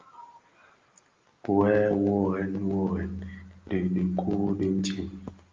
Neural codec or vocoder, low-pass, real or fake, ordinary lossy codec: none; 7.2 kHz; real; Opus, 32 kbps